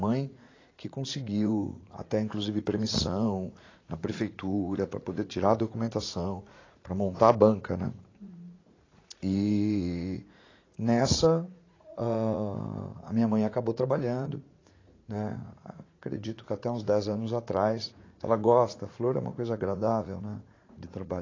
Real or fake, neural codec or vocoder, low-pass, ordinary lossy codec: fake; vocoder, 44.1 kHz, 80 mel bands, Vocos; 7.2 kHz; AAC, 32 kbps